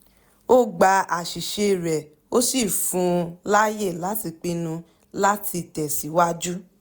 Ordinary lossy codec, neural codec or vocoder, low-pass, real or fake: none; none; none; real